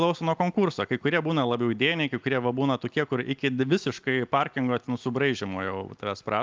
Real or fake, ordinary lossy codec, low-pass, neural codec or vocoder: real; Opus, 24 kbps; 7.2 kHz; none